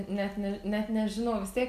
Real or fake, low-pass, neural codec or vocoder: real; 14.4 kHz; none